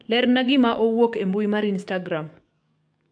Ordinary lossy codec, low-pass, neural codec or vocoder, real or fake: MP3, 64 kbps; 9.9 kHz; codec, 44.1 kHz, 7.8 kbps, DAC; fake